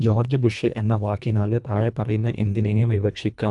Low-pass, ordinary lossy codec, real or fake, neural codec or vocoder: 10.8 kHz; none; fake; codec, 24 kHz, 1.5 kbps, HILCodec